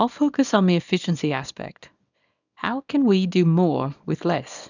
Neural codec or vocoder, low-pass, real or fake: codec, 24 kHz, 0.9 kbps, WavTokenizer, small release; 7.2 kHz; fake